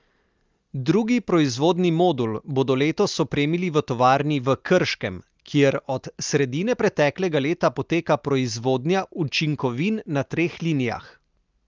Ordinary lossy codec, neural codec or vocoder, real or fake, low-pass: Opus, 32 kbps; none; real; 7.2 kHz